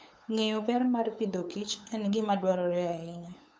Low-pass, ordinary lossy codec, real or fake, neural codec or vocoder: none; none; fake; codec, 16 kHz, 8 kbps, FunCodec, trained on LibriTTS, 25 frames a second